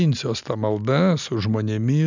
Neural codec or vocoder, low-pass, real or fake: none; 7.2 kHz; real